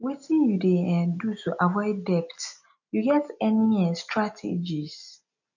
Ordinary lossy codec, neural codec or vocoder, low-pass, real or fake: none; none; 7.2 kHz; real